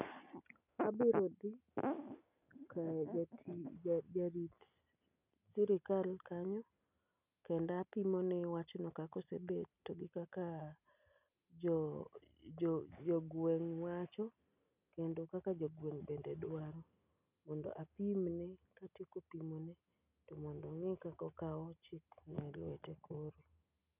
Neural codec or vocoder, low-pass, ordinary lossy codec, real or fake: none; 3.6 kHz; none; real